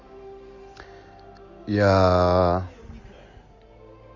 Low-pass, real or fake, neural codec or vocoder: 7.2 kHz; real; none